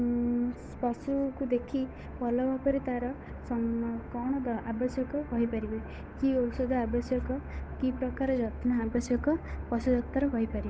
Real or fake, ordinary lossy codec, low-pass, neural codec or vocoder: fake; none; none; codec, 16 kHz, 8 kbps, FunCodec, trained on Chinese and English, 25 frames a second